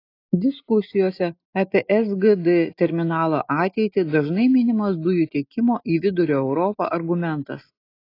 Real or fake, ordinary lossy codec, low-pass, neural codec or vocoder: real; AAC, 32 kbps; 5.4 kHz; none